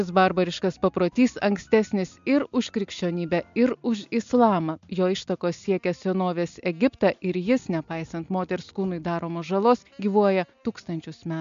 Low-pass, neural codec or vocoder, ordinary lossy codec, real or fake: 7.2 kHz; none; MP3, 64 kbps; real